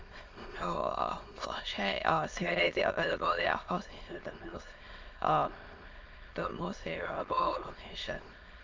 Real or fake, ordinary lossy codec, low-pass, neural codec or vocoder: fake; Opus, 32 kbps; 7.2 kHz; autoencoder, 22.05 kHz, a latent of 192 numbers a frame, VITS, trained on many speakers